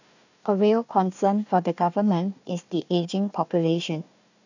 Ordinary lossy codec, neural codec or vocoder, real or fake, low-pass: none; codec, 16 kHz, 1 kbps, FunCodec, trained on Chinese and English, 50 frames a second; fake; 7.2 kHz